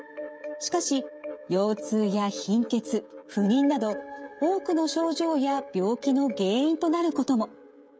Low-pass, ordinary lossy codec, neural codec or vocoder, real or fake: none; none; codec, 16 kHz, 16 kbps, FreqCodec, smaller model; fake